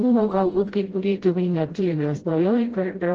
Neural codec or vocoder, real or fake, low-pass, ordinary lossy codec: codec, 16 kHz, 0.5 kbps, FreqCodec, smaller model; fake; 7.2 kHz; Opus, 16 kbps